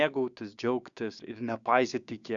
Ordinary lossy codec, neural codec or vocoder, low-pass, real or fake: Opus, 64 kbps; codec, 16 kHz, 6 kbps, DAC; 7.2 kHz; fake